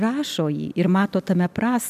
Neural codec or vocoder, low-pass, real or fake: none; 14.4 kHz; real